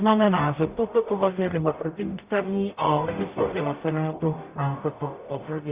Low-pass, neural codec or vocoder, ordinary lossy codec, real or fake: 3.6 kHz; codec, 44.1 kHz, 0.9 kbps, DAC; Opus, 64 kbps; fake